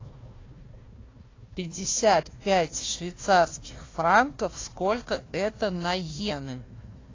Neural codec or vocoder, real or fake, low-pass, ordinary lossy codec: codec, 16 kHz, 1 kbps, FunCodec, trained on Chinese and English, 50 frames a second; fake; 7.2 kHz; AAC, 32 kbps